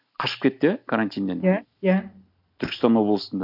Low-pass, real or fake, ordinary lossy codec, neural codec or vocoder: 5.4 kHz; real; none; none